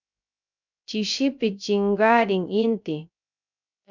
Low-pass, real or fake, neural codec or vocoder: 7.2 kHz; fake; codec, 16 kHz, 0.3 kbps, FocalCodec